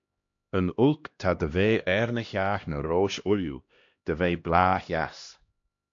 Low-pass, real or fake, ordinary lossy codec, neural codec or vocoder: 7.2 kHz; fake; AAC, 48 kbps; codec, 16 kHz, 1 kbps, X-Codec, HuBERT features, trained on LibriSpeech